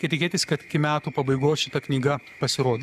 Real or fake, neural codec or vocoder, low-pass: fake; codec, 44.1 kHz, 7.8 kbps, Pupu-Codec; 14.4 kHz